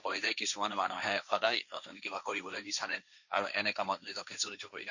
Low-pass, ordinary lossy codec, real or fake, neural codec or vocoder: 7.2 kHz; none; fake; codec, 16 kHz, 1.1 kbps, Voila-Tokenizer